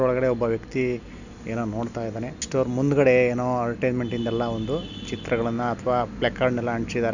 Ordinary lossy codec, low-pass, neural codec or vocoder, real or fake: none; 7.2 kHz; none; real